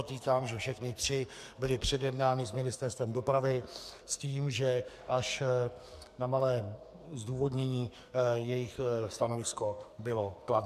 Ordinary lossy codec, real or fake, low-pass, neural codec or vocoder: MP3, 96 kbps; fake; 14.4 kHz; codec, 44.1 kHz, 2.6 kbps, SNAC